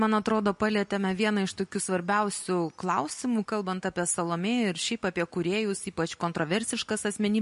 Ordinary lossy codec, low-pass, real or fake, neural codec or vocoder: MP3, 48 kbps; 14.4 kHz; real; none